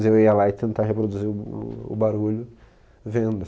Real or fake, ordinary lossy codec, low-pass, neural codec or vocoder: real; none; none; none